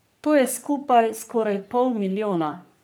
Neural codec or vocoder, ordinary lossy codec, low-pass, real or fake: codec, 44.1 kHz, 3.4 kbps, Pupu-Codec; none; none; fake